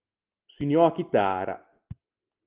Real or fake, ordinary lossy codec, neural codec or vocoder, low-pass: real; Opus, 32 kbps; none; 3.6 kHz